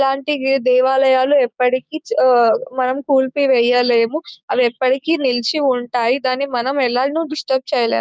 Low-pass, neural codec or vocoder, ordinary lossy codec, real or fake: none; codec, 16 kHz, 6 kbps, DAC; none; fake